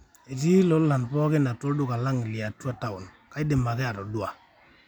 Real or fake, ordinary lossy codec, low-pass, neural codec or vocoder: real; none; 19.8 kHz; none